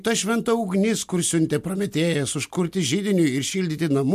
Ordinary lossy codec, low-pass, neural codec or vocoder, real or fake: MP3, 64 kbps; 14.4 kHz; none; real